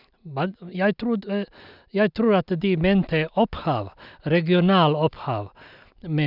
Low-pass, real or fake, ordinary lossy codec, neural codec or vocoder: 5.4 kHz; real; none; none